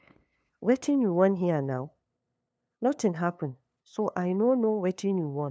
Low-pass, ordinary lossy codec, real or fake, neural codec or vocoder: none; none; fake; codec, 16 kHz, 2 kbps, FunCodec, trained on LibriTTS, 25 frames a second